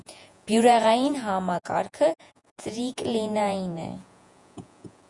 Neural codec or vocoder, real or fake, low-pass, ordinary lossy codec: vocoder, 48 kHz, 128 mel bands, Vocos; fake; 10.8 kHz; Opus, 64 kbps